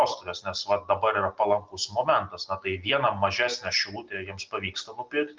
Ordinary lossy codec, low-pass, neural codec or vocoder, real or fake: Opus, 24 kbps; 7.2 kHz; none; real